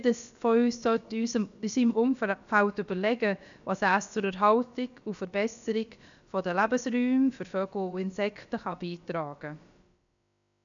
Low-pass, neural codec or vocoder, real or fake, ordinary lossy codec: 7.2 kHz; codec, 16 kHz, about 1 kbps, DyCAST, with the encoder's durations; fake; none